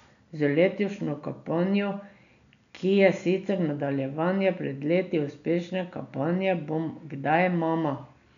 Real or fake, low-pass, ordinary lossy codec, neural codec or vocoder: real; 7.2 kHz; none; none